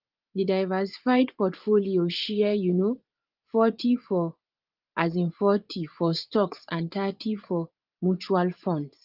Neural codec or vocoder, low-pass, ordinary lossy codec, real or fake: vocoder, 24 kHz, 100 mel bands, Vocos; 5.4 kHz; Opus, 32 kbps; fake